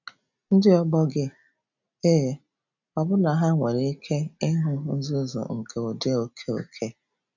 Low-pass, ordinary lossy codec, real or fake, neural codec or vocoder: 7.2 kHz; none; real; none